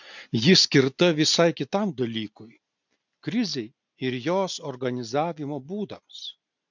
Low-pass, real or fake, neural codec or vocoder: 7.2 kHz; real; none